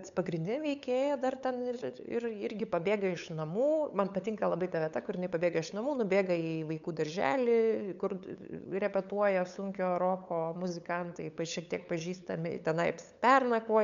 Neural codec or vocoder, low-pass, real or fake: codec, 16 kHz, 8 kbps, FunCodec, trained on LibriTTS, 25 frames a second; 7.2 kHz; fake